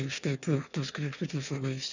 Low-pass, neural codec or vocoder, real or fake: 7.2 kHz; autoencoder, 22.05 kHz, a latent of 192 numbers a frame, VITS, trained on one speaker; fake